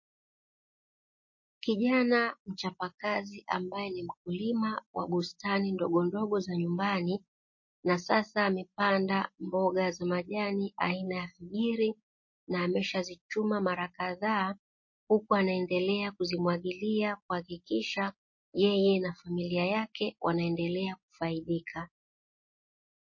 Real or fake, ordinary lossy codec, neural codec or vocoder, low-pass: real; MP3, 32 kbps; none; 7.2 kHz